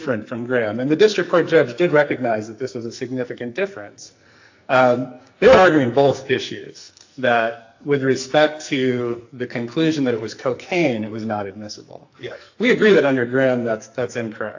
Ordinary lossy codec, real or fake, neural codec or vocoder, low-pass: AAC, 48 kbps; fake; codec, 44.1 kHz, 2.6 kbps, SNAC; 7.2 kHz